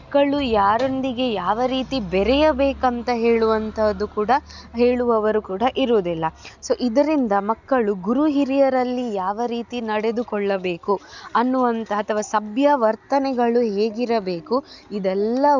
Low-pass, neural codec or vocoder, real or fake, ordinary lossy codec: 7.2 kHz; none; real; none